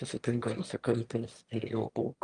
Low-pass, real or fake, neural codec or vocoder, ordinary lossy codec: 9.9 kHz; fake; autoencoder, 22.05 kHz, a latent of 192 numbers a frame, VITS, trained on one speaker; Opus, 32 kbps